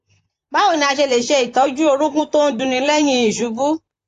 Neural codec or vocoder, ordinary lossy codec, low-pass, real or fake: vocoder, 44.1 kHz, 128 mel bands, Pupu-Vocoder; AAC, 48 kbps; 14.4 kHz; fake